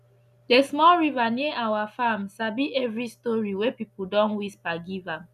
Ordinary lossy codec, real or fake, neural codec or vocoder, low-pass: none; real; none; 14.4 kHz